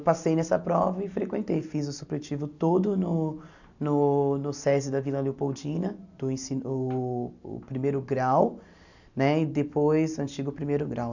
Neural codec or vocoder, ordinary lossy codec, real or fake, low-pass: none; none; real; 7.2 kHz